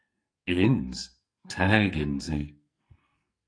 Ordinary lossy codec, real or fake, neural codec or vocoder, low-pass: AAC, 48 kbps; fake; codec, 44.1 kHz, 2.6 kbps, SNAC; 9.9 kHz